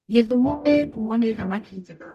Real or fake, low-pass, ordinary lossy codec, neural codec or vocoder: fake; 14.4 kHz; none; codec, 44.1 kHz, 0.9 kbps, DAC